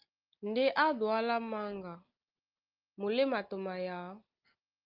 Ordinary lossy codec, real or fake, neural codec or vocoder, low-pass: Opus, 32 kbps; real; none; 5.4 kHz